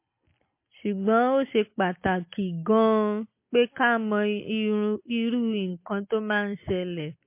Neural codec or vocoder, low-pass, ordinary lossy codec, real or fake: none; 3.6 kHz; MP3, 24 kbps; real